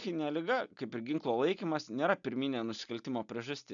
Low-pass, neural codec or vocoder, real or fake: 7.2 kHz; none; real